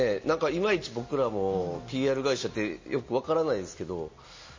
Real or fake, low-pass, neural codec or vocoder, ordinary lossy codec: real; 7.2 kHz; none; MP3, 32 kbps